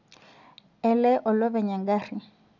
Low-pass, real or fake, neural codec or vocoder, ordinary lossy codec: 7.2 kHz; real; none; none